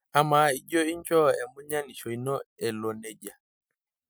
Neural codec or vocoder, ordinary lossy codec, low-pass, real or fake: none; none; none; real